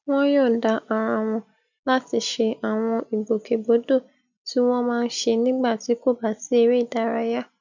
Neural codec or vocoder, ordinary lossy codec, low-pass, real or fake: none; none; 7.2 kHz; real